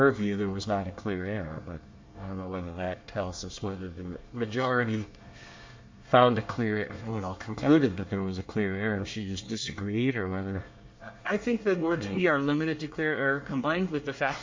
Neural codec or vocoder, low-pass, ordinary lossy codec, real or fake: codec, 24 kHz, 1 kbps, SNAC; 7.2 kHz; MP3, 48 kbps; fake